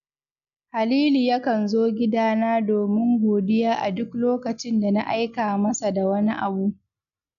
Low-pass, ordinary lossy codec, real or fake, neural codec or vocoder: 7.2 kHz; none; real; none